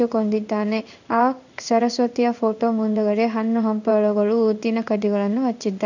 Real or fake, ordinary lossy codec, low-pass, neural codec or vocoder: fake; none; 7.2 kHz; codec, 16 kHz in and 24 kHz out, 1 kbps, XY-Tokenizer